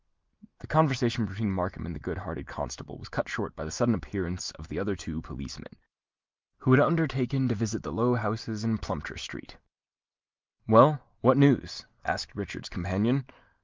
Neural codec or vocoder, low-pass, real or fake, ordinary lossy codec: none; 7.2 kHz; real; Opus, 24 kbps